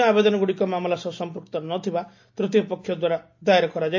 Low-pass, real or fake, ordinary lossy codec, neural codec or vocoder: 7.2 kHz; real; AAC, 48 kbps; none